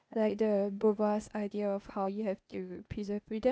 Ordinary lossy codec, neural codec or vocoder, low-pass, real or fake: none; codec, 16 kHz, 0.8 kbps, ZipCodec; none; fake